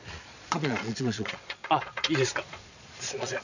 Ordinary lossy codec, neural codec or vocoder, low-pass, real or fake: none; vocoder, 22.05 kHz, 80 mel bands, Vocos; 7.2 kHz; fake